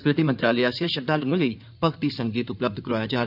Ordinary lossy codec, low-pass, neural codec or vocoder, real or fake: none; 5.4 kHz; codec, 16 kHz in and 24 kHz out, 2.2 kbps, FireRedTTS-2 codec; fake